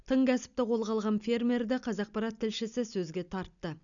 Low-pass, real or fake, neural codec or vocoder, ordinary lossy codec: 7.2 kHz; real; none; none